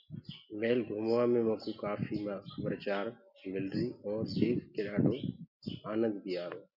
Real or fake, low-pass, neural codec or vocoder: real; 5.4 kHz; none